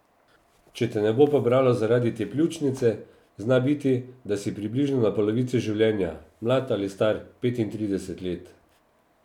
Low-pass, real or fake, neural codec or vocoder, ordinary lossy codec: 19.8 kHz; real; none; none